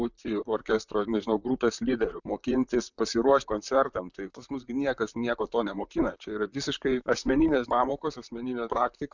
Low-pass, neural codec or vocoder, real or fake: 7.2 kHz; vocoder, 44.1 kHz, 128 mel bands, Pupu-Vocoder; fake